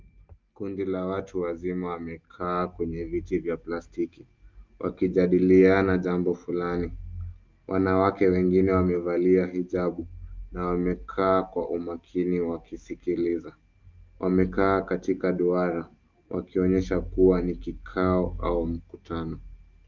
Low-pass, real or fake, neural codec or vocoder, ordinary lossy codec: 7.2 kHz; real; none; Opus, 24 kbps